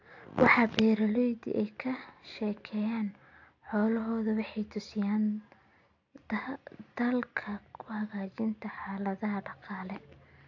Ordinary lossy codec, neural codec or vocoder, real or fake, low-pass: AAC, 48 kbps; none; real; 7.2 kHz